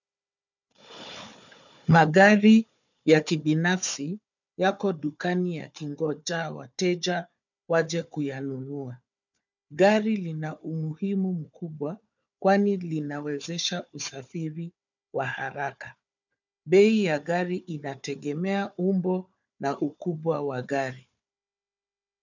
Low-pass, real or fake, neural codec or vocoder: 7.2 kHz; fake; codec, 16 kHz, 4 kbps, FunCodec, trained on Chinese and English, 50 frames a second